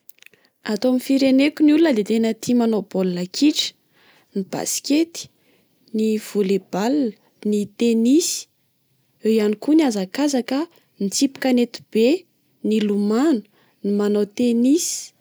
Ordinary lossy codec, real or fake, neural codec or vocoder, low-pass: none; real; none; none